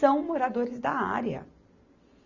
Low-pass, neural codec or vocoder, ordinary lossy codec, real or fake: 7.2 kHz; none; none; real